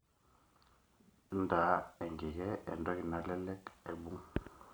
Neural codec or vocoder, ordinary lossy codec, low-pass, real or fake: none; none; none; real